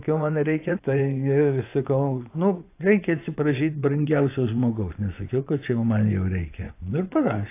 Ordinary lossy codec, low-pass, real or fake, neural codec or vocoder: AAC, 24 kbps; 3.6 kHz; fake; vocoder, 24 kHz, 100 mel bands, Vocos